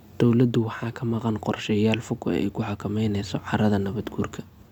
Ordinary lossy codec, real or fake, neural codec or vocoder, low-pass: none; real; none; 19.8 kHz